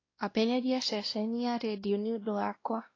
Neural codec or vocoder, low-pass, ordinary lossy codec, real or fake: codec, 16 kHz, 1 kbps, X-Codec, WavLM features, trained on Multilingual LibriSpeech; 7.2 kHz; AAC, 32 kbps; fake